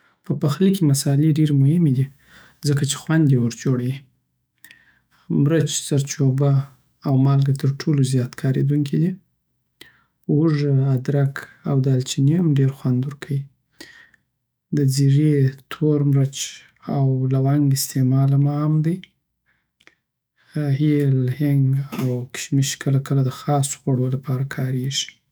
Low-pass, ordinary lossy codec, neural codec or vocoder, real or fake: none; none; autoencoder, 48 kHz, 128 numbers a frame, DAC-VAE, trained on Japanese speech; fake